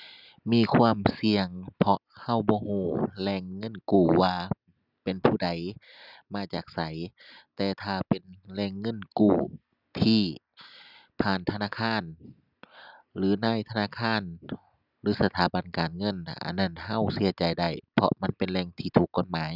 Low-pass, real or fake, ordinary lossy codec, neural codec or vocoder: 5.4 kHz; real; none; none